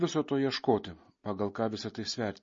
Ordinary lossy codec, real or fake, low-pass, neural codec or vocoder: MP3, 32 kbps; real; 7.2 kHz; none